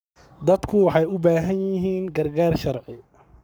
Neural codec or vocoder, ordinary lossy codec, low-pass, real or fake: codec, 44.1 kHz, 7.8 kbps, Pupu-Codec; none; none; fake